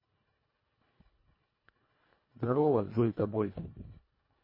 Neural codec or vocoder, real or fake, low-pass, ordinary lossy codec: codec, 24 kHz, 1.5 kbps, HILCodec; fake; 5.4 kHz; MP3, 24 kbps